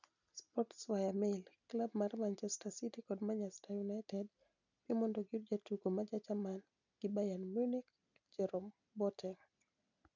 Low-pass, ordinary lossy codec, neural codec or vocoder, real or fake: 7.2 kHz; none; none; real